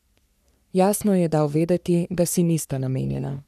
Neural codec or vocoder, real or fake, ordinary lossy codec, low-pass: codec, 44.1 kHz, 3.4 kbps, Pupu-Codec; fake; none; 14.4 kHz